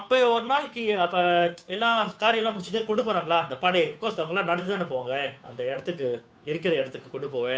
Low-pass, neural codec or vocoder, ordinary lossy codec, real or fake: none; codec, 16 kHz, 2 kbps, FunCodec, trained on Chinese and English, 25 frames a second; none; fake